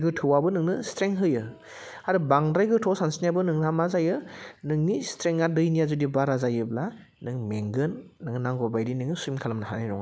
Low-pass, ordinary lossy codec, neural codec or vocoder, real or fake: none; none; none; real